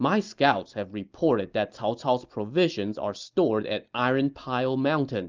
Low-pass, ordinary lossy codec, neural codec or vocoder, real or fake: 7.2 kHz; Opus, 24 kbps; none; real